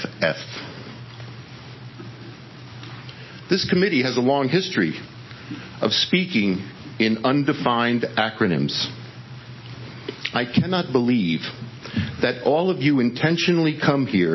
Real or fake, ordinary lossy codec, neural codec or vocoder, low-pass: real; MP3, 24 kbps; none; 7.2 kHz